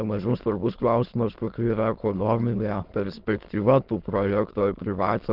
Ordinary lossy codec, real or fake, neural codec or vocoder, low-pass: Opus, 16 kbps; fake; autoencoder, 22.05 kHz, a latent of 192 numbers a frame, VITS, trained on many speakers; 5.4 kHz